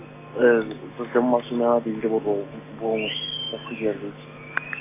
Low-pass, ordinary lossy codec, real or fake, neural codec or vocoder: 3.6 kHz; AAC, 24 kbps; real; none